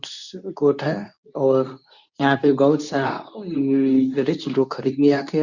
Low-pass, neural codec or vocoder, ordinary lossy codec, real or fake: 7.2 kHz; codec, 24 kHz, 0.9 kbps, WavTokenizer, medium speech release version 2; none; fake